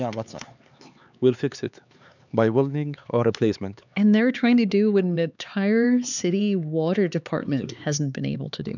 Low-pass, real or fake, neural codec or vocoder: 7.2 kHz; fake; codec, 16 kHz, 4 kbps, X-Codec, HuBERT features, trained on LibriSpeech